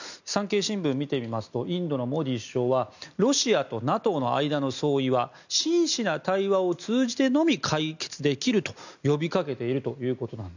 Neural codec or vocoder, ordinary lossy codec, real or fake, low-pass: none; none; real; 7.2 kHz